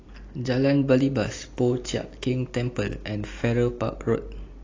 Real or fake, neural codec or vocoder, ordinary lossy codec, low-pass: real; none; AAC, 32 kbps; 7.2 kHz